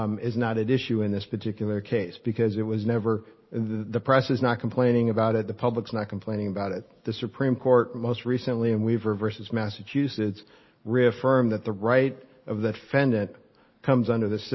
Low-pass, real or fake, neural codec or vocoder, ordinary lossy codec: 7.2 kHz; real; none; MP3, 24 kbps